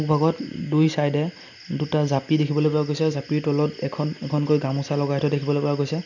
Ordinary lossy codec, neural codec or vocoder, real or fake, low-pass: none; none; real; 7.2 kHz